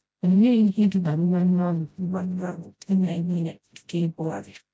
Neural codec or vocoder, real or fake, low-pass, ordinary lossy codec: codec, 16 kHz, 0.5 kbps, FreqCodec, smaller model; fake; none; none